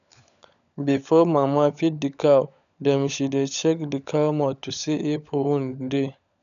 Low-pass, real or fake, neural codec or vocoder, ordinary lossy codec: 7.2 kHz; fake; codec, 16 kHz, 16 kbps, FunCodec, trained on LibriTTS, 50 frames a second; none